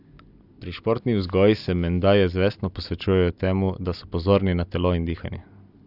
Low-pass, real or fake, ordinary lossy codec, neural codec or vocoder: 5.4 kHz; real; none; none